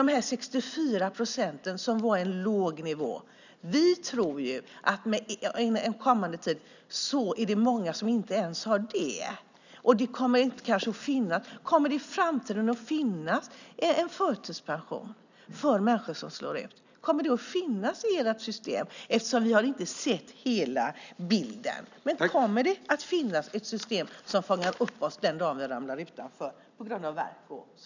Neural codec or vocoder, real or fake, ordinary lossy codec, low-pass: none; real; none; 7.2 kHz